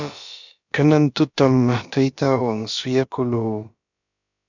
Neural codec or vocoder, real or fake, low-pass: codec, 16 kHz, about 1 kbps, DyCAST, with the encoder's durations; fake; 7.2 kHz